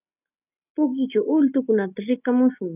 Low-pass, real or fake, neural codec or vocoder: 3.6 kHz; real; none